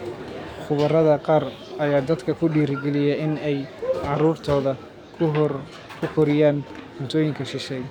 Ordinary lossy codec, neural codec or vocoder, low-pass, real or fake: none; codec, 44.1 kHz, 7.8 kbps, DAC; 19.8 kHz; fake